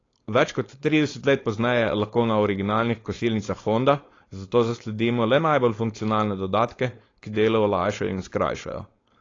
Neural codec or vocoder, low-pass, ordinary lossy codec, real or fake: codec, 16 kHz, 4.8 kbps, FACodec; 7.2 kHz; AAC, 32 kbps; fake